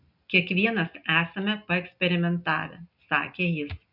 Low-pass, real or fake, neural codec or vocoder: 5.4 kHz; real; none